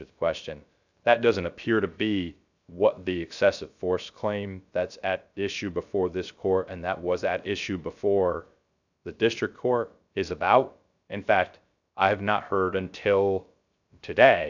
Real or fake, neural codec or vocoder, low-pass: fake; codec, 16 kHz, 0.3 kbps, FocalCodec; 7.2 kHz